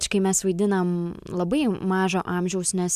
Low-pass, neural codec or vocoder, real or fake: 14.4 kHz; none; real